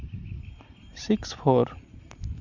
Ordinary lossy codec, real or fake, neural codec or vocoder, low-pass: none; real; none; 7.2 kHz